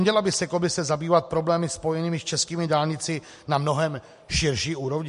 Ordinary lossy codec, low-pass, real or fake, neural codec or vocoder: MP3, 48 kbps; 14.4 kHz; real; none